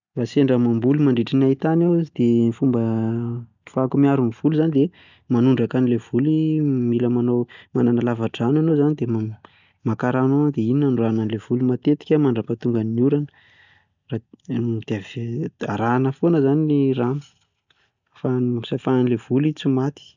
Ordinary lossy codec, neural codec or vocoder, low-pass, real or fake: none; none; 7.2 kHz; real